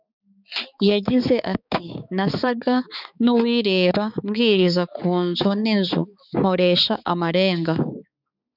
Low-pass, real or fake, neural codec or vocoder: 5.4 kHz; fake; codec, 16 kHz, 4 kbps, X-Codec, HuBERT features, trained on balanced general audio